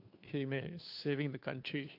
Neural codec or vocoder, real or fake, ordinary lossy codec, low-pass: codec, 16 kHz, 2 kbps, FunCodec, trained on Chinese and English, 25 frames a second; fake; AAC, 32 kbps; 5.4 kHz